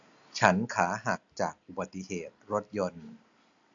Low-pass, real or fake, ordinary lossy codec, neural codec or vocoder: 7.2 kHz; real; AAC, 64 kbps; none